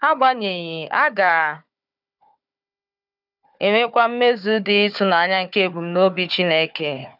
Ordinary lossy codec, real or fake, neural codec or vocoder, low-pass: none; fake; codec, 16 kHz, 4 kbps, FunCodec, trained on Chinese and English, 50 frames a second; 5.4 kHz